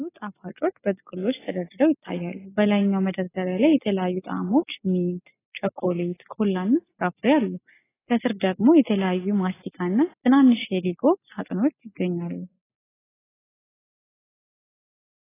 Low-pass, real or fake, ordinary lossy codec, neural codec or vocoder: 3.6 kHz; real; AAC, 16 kbps; none